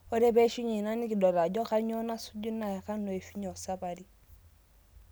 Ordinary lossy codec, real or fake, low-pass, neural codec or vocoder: none; real; none; none